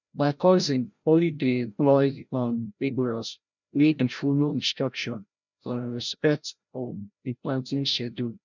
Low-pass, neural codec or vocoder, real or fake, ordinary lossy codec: 7.2 kHz; codec, 16 kHz, 0.5 kbps, FreqCodec, larger model; fake; none